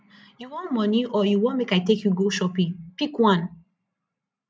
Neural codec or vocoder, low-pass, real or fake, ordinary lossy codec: none; none; real; none